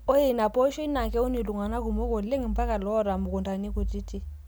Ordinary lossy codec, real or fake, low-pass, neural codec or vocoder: none; real; none; none